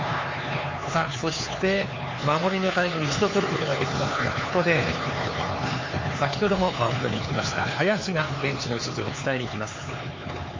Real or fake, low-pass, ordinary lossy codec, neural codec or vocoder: fake; 7.2 kHz; MP3, 32 kbps; codec, 16 kHz, 4 kbps, X-Codec, HuBERT features, trained on LibriSpeech